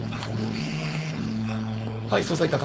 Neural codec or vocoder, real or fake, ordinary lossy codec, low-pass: codec, 16 kHz, 4.8 kbps, FACodec; fake; none; none